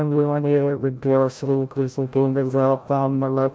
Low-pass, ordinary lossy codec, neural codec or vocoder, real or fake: none; none; codec, 16 kHz, 0.5 kbps, FreqCodec, larger model; fake